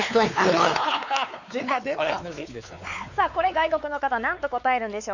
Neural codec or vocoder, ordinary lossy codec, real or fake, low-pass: codec, 16 kHz, 4 kbps, X-Codec, WavLM features, trained on Multilingual LibriSpeech; none; fake; 7.2 kHz